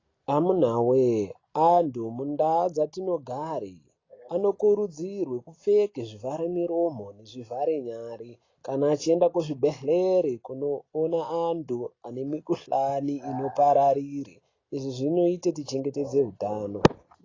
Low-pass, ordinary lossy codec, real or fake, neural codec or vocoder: 7.2 kHz; AAC, 32 kbps; real; none